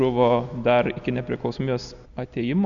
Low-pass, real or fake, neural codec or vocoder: 7.2 kHz; real; none